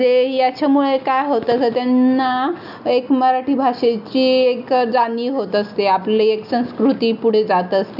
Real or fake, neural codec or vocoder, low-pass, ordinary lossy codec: real; none; 5.4 kHz; none